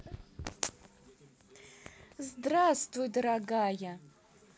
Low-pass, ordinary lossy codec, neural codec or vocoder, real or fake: none; none; none; real